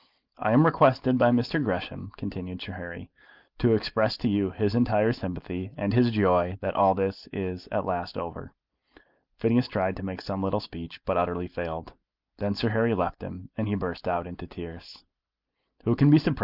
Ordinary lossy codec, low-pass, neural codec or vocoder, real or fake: Opus, 32 kbps; 5.4 kHz; none; real